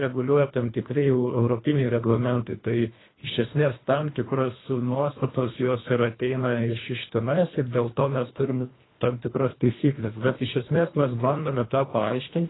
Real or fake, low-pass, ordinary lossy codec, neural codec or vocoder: fake; 7.2 kHz; AAC, 16 kbps; codec, 24 kHz, 1.5 kbps, HILCodec